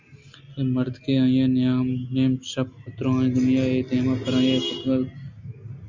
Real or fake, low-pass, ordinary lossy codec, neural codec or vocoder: real; 7.2 kHz; MP3, 64 kbps; none